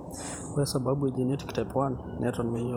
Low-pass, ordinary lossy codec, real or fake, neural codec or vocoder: none; none; fake; vocoder, 44.1 kHz, 128 mel bands every 512 samples, BigVGAN v2